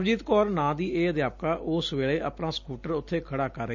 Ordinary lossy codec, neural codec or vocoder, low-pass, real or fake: none; none; 7.2 kHz; real